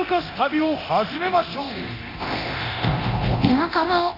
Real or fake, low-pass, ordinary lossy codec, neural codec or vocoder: fake; 5.4 kHz; none; codec, 24 kHz, 0.9 kbps, DualCodec